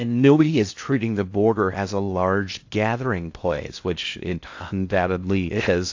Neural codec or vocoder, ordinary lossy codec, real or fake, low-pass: codec, 16 kHz in and 24 kHz out, 0.6 kbps, FocalCodec, streaming, 4096 codes; AAC, 48 kbps; fake; 7.2 kHz